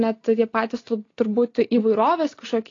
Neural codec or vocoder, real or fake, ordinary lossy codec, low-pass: none; real; AAC, 32 kbps; 7.2 kHz